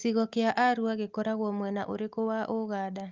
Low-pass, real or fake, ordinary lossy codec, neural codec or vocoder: 7.2 kHz; real; Opus, 32 kbps; none